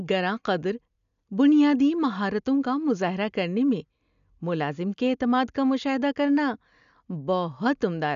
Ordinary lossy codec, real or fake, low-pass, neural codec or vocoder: none; real; 7.2 kHz; none